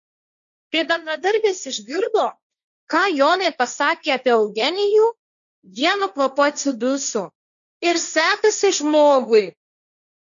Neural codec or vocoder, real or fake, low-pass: codec, 16 kHz, 1.1 kbps, Voila-Tokenizer; fake; 7.2 kHz